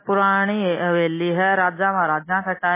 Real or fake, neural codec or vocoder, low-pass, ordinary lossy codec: real; none; 3.6 kHz; MP3, 16 kbps